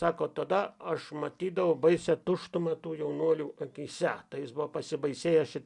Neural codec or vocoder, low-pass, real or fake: none; 10.8 kHz; real